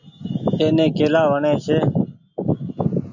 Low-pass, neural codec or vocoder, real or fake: 7.2 kHz; none; real